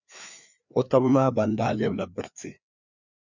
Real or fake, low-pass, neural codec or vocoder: fake; 7.2 kHz; codec, 16 kHz, 2 kbps, FreqCodec, larger model